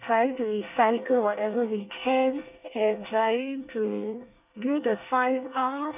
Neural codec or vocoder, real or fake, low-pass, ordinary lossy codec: codec, 24 kHz, 1 kbps, SNAC; fake; 3.6 kHz; none